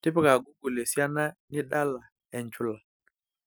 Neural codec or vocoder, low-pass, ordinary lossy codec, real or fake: none; none; none; real